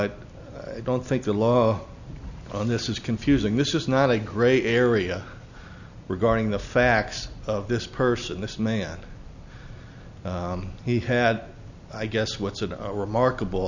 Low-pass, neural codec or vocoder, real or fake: 7.2 kHz; none; real